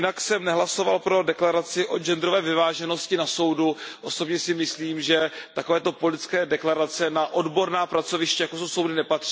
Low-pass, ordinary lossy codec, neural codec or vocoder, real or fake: none; none; none; real